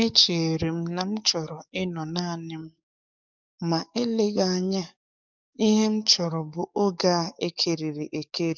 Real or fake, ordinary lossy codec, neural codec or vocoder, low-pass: fake; none; codec, 44.1 kHz, 7.8 kbps, DAC; 7.2 kHz